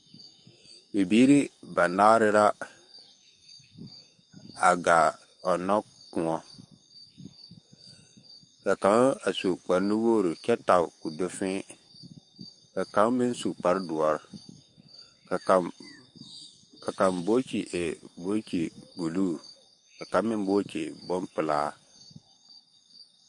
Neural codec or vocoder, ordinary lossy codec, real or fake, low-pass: codec, 44.1 kHz, 7.8 kbps, DAC; MP3, 48 kbps; fake; 10.8 kHz